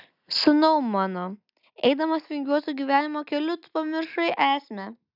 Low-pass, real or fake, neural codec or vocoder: 5.4 kHz; real; none